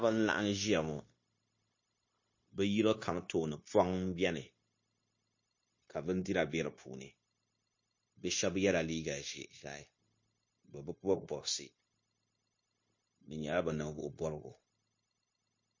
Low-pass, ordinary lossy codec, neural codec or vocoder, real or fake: 7.2 kHz; MP3, 32 kbps; codec, 16 kHz, 0.9 kbps, LongCat-Audio-Codec; fake